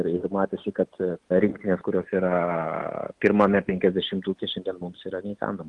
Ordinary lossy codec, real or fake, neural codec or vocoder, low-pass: Opus, 24 kbps; real; none; 10.8 kHz